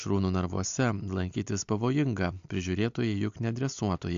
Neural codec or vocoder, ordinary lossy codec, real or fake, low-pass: none; AAC, 64 kbps; real; 7.2 kHz